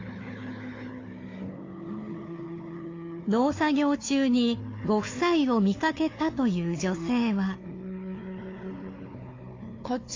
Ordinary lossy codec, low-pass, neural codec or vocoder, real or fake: AAC, 32 kbps; 7.2 kHz; codec, 16 kHz, 4 kbps, FunCodec, trained on Chinese and English, 50 frames a second; fake